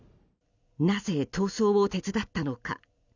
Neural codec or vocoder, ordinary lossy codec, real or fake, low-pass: none; none; real; 7.2 kHz